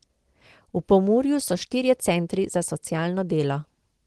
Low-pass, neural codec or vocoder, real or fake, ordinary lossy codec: 10.8 kHz; none; real; Opus, 16 kbps